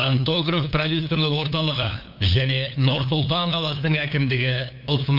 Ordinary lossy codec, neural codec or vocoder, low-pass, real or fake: none; codec, 16 kHz, 2 kbps, FunCodec, trained on LibriTTS, 25 frames a second; 5.4 kHz; fake